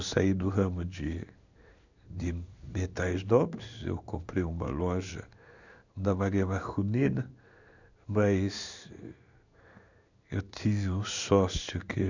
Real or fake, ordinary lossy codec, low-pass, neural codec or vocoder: fake; none; 7.2 kHz; codec, 16 kHz in and 24 kHz out, 1 kbps, XY-Tokenizer